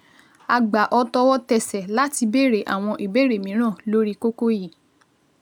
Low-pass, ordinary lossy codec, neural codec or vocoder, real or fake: 19.8 kHz; none; none; real